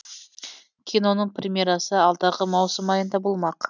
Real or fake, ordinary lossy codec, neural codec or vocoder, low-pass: real; none; none; 7.2 kHz